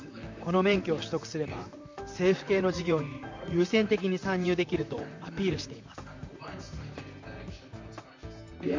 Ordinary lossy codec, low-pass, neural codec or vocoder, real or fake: MP3, 64 kbps; 7.2 kHz; vocoder, 44.1 kHz, 128 mel bands, Pupu-Vocoder; fake